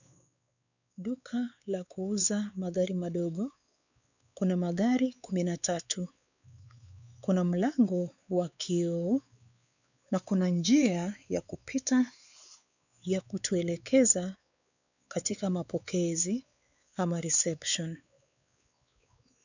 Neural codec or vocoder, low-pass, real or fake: codec, 16 kHz, 4 kbps, X-Codec, WavLM features, trained on Multilingual LibriSpeech; 7.2 kHz; fake